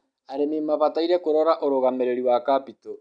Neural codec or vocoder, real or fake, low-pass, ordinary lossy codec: none; real; 9.9 kHz; none